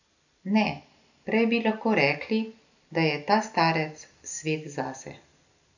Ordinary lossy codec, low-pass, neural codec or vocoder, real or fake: none; 7.2 kHz; none; real